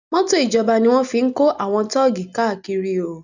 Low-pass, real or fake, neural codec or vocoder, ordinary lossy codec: 7.2 kHz; real; none; none